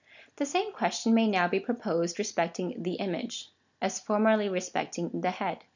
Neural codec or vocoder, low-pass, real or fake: none; 7.2 kHz; real